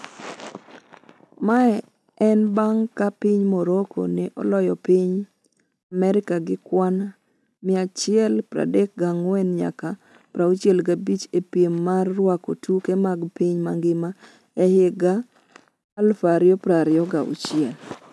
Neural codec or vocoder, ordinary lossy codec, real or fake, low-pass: none; none; real; none